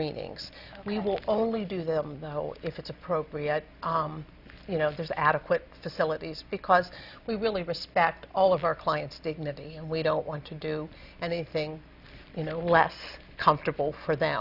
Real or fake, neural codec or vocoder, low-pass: real; none; 5.4 kHz